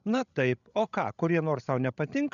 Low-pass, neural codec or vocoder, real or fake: 7.2 kHz; codec, 16 kHz, 16 kbps, FreqCodec, larger model; fake